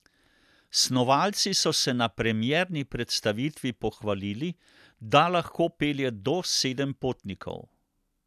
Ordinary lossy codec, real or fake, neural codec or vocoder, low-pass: none; real; none; 14.4 kHz